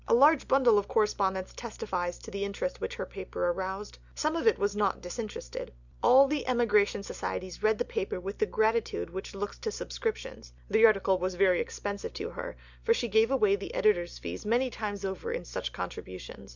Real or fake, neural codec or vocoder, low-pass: real; none; 7.2 kHz